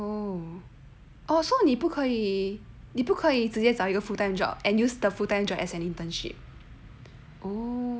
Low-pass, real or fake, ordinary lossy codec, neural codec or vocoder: none; real; none; none